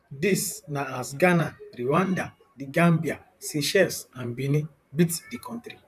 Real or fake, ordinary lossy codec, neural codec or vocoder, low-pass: fake; none; vocoder, 44.1 kHz, 128 mel bands, Pupu-Vocoder; 14.4 kHz